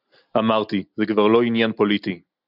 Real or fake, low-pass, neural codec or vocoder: real; 5.4 kHz; none